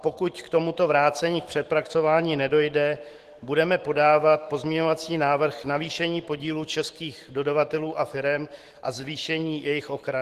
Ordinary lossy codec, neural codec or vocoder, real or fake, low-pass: Opus, 16 kbps; autoencoder, 48 kHz, 128 numbers a frame, DAC-VAE, trained on Japanese speech; fake; 14.4 kHz